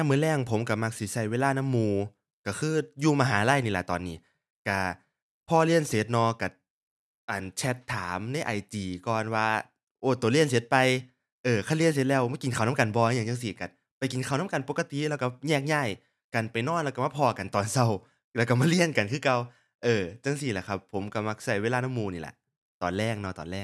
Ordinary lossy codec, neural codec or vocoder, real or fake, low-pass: none; none; real; none